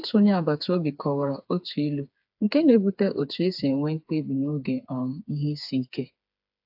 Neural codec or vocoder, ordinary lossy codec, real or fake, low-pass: codec, 16 kHz, 4 kbps, FreqCodec, smaller model; AAC, 48 kbps; fake; 5.4 kHz